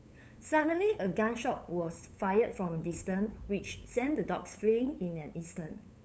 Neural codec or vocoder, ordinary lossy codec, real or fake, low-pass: codec, 16 kHz, 8 kbps, FunCodec, trained on LibriTTS, 25 frames a second; none; fake; none